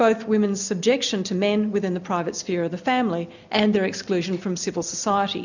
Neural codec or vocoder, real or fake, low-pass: none; real; 7.2 kHz